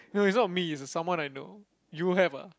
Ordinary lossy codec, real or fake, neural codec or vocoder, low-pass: none; real; none; none